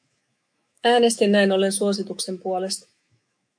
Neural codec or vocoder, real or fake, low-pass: autoencoder, 48 kHz, 128 numbers a frame, DAC-VAE, trained on Japanese speech; fake; 9.9 kHz